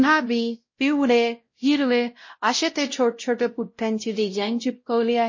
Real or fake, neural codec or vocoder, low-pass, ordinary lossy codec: fake; codec, 16 kHz, 0.5 kbps, X-Codec, WavLM features, trained on Multilingual LibriSpeech; 7.2 kHz; MP3, 32 kbps